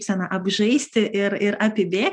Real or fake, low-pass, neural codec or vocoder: real; 9.9 kHz; none